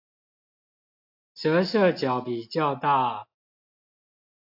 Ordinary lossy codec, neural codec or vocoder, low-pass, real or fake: AAC, 48 kbps; none; 5.4 kHz; real